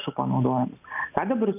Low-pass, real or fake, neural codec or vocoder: 3.6 kHz; real; none